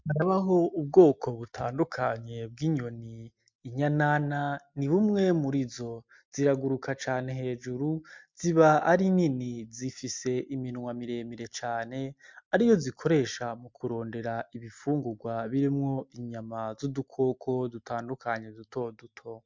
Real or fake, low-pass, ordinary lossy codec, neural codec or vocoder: real; 7.2 kHz; MP3, 64 kbps; none